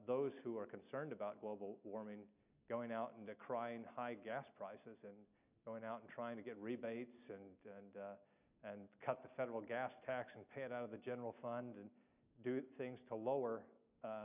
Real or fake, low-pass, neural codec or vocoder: real; 3.6 kHz; none